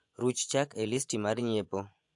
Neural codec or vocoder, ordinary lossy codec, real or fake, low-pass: vocoder, 24 kHz, 100 mel bands, Vocos; AAC, 64 kbps; fake; 10.8 kHz